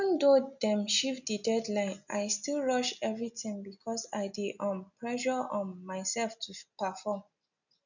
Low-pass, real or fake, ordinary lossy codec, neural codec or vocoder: 7.2 kHz; real; none; none